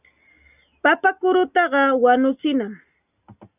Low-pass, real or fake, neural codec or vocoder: 3.6 kHz; real; none